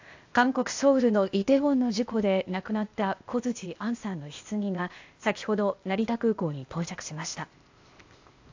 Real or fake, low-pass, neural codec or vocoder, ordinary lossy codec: fake; 7.2 kHz; codec, 16 kHz, 0.8 kbps, ZipCodec; AAC, 48 kbps